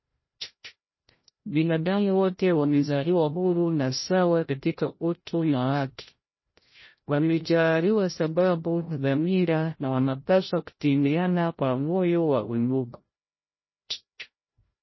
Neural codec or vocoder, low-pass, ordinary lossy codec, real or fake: codec, 16 kHz, 0.5 kbps, FreqCodec, larger model; 7.2 kHz; MP3, 24 kbps; fake